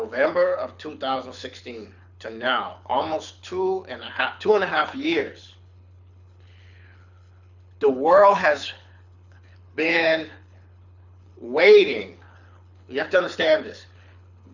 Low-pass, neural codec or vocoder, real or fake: 7.2 kHz; codec, 24 kHz, 6 kbps, HILCodec; fake